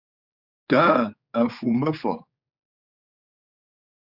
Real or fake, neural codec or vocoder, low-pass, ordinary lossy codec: fake; codec, 16 kHz, 4 kbps, X-Codec, HuBERT features, trained on general audio; 5.4 kHz; Opus, 64 kbps